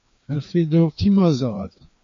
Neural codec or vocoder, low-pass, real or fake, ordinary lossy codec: codec, 16 kHz, 2 kbps, FreqCodec, larger model; 7.2 kHz; fake; MP3, 48 kbps